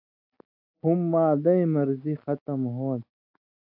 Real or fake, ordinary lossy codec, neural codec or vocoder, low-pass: real; AAC, 32 kbps; none; 5.4 kHz